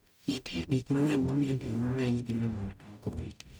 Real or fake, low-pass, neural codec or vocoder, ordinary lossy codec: fake; none; codec, 44.1 kHz, 0.9 kbps, DAC; none